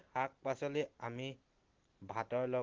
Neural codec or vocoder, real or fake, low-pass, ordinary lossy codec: none; real; 7.2 kHz; Opus, 16 kbps